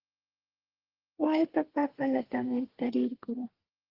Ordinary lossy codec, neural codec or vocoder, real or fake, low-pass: Opus, 16 kbps; codec, 32 kHz, 1.9 kbps, SNAC; fake; 5.4 kHz